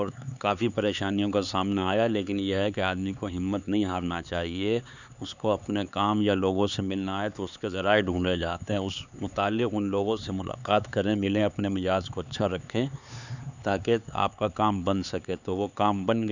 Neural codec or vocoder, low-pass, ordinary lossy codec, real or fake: codec, 16 kHz, 4 kbps, X-Codec, HuBERT features, trained on LibriSpeech; 7.2 kHz; none; fake